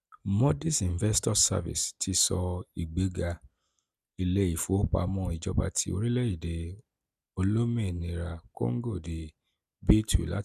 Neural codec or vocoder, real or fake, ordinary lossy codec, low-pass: none; real; none; 14.4 kHz